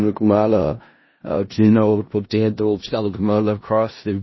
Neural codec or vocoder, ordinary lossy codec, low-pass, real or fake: codec, 16 kHz in and 24 kHz out, 0.4 kbps, LongCat-Audio-Codec, four codebook decoder; MP3, 24 kbps; 7.2 kHz; fake